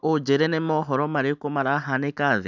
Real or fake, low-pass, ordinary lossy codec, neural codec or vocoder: real; 7.2 kHz; none; none